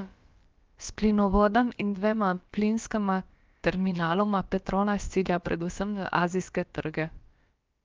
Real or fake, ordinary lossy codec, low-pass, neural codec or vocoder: fake; Opus, 24 kbps; 7.2 kHz; codec, 16 kHz, about 1 kbps, DyCAST, with the encoder's durations